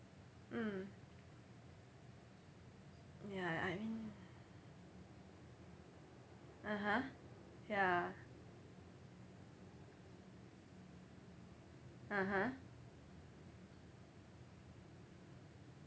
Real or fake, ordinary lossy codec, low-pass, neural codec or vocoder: real; none; none; none